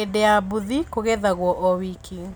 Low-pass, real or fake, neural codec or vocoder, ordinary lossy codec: none; real; none; none